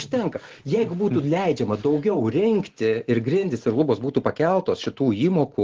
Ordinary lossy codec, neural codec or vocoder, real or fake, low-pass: Opus, 16 kbps; none; real; 7.2 kHz